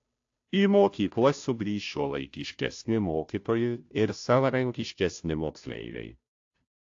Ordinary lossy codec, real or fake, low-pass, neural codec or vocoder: AAC, 48 kbps; fake; 7.2 kHz; codec, 16 kHz, 0.5 kbps, FunCodec, trained on Chinese and English, 25 frames a second